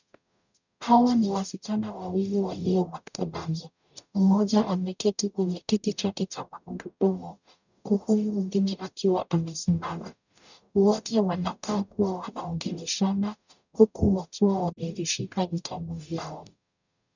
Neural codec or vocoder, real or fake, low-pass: codec, 44.1 kHz, 0.9 kbps, DAC; fake; 7.2 kHz